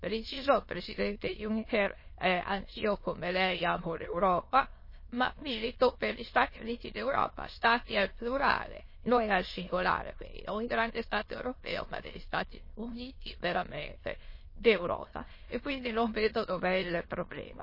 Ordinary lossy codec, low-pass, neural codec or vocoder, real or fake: MP3, 24 kbps; 5.4 kHz; autoencoder, 22.05 kHz, a latent of 192 numbers a frame, VITS, trained on many speakers; fake